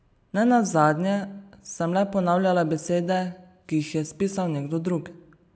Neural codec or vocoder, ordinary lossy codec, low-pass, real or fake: none; none; none; real